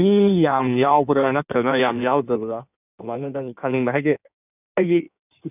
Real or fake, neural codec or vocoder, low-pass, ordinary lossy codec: fake; codec, 16 kHz in and 24 kHz out, 1.1 kbps, FireRedTTS-2 codec; 3.6 kHz; none